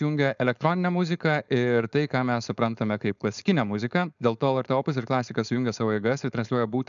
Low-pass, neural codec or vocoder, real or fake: 7.2 kHz; codec, 16 kHz, 4.8 kbps, FACodec; fake